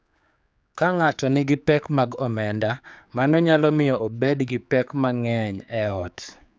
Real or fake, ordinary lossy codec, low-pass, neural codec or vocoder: fake; none; none; codec, 16 kHz, 4 kbps, X-Codec, HuBERT features, trained on general audio